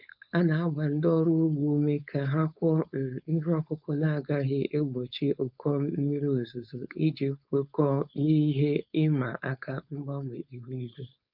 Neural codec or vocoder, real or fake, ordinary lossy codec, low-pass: codec, 16 kHz, 4.8 kbps, FACodec; fake; none; 5.4 kHz